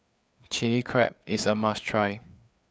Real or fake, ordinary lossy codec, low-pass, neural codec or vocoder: fake; none; none; codec, 16 kHz, 4 kbps, FreqCodec, larger model